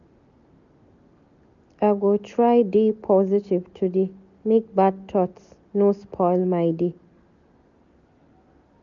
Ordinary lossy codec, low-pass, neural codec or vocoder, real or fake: none; 7.2 kHz; none; real